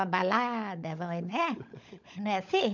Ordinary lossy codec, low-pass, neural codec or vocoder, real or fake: none; 7.2 kHz; codec, 16 kHz, 16 kbps, FunCodec, trained on LibriTTS, 50 frames a second; fake